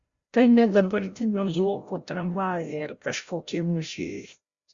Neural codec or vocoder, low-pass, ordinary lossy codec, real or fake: codec, 16 kHz, 0.5 kbps, FreqCodec, larger model; 7.2 kHz; Opus, 64 kbps; fake